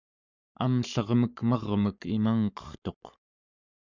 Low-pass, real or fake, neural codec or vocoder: 7.2 kHz; fake; codec, 44.1 kHz, 7.8 kbps, Pupu-Codec